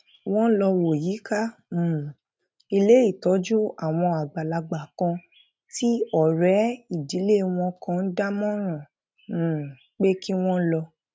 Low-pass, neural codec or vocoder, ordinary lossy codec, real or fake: none; none; none; real